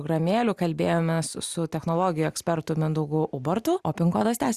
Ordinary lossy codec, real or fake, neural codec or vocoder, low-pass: Opus, 64 kbps; real; none; 14.4 kHz